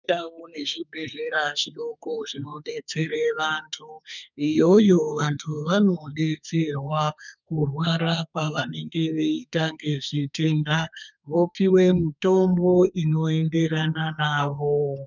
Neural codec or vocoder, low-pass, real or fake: codec, 32 kHz, 1.9 kbps, SNAC; 7.2 kHz; fake